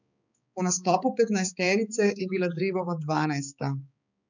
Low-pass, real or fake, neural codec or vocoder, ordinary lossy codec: 7.2 kHz; fake; codec, 16 kHz, 4 kbps, X-Codec, HuBERT features, trained on balanced general audio; none